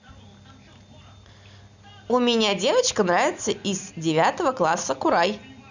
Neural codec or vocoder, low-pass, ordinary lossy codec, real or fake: none; 7.2 kHz; none; real